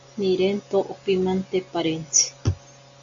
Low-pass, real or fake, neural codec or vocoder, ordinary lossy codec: 7.2 kHz; real; none; AAC, 64 kbps